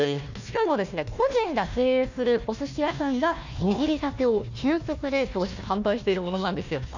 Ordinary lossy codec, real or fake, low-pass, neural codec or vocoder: none; fake; 7.2 kHz; codec, 16 kHz, 1 kbps, FunCodec, trained on Chinese and English, 50 frames a second